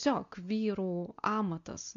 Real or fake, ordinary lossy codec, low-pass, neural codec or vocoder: real; MP3, 64 kbps; 7.2 kHz; none